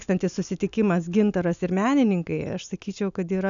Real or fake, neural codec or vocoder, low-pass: real; none; 7.2 kHz